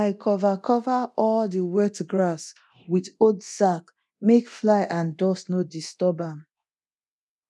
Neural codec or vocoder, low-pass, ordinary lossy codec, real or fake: codec, 24 kHz, 0.9 kbps, DualCodec; none; none; fake